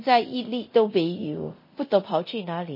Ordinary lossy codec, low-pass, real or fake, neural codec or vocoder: MP3, 24 kbps; 5.4 kHz; fake; codec, 24 kHz, 0.9 kbps, DualCodec